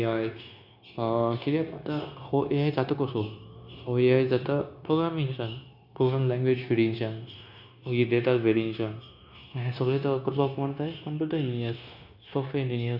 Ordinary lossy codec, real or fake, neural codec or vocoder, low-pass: none; fake; codec, 16 kHz, 0.9 kbps, LongCat-Audio-Codec; 5.4 kHz